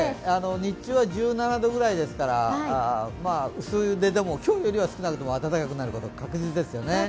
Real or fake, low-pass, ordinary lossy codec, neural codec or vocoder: real; none; none; none